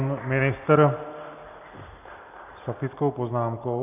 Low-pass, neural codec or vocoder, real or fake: 3.6 kHz; none; real